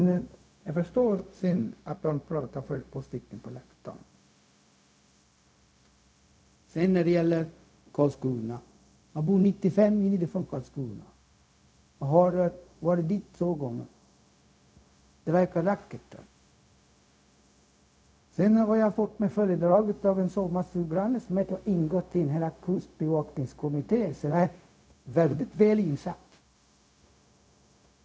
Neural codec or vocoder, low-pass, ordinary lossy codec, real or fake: codec, 16 kHz, 0.4 kbps, LongCat-Audio-Codec; none; none; fake